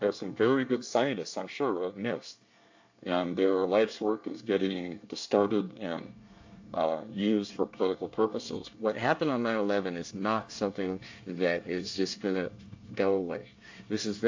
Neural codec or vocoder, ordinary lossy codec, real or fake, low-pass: codec, 24 kHz, 1 kbps, SNAC; AAC, 48 kbps; fake; 7.2 kHz